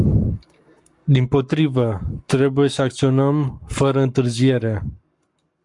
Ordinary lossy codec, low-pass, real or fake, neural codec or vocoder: MP3, 64 kbps; 10.8 kHz; fake; codec, 44.1 kHz, 7.8 kbps, Pupu-Codec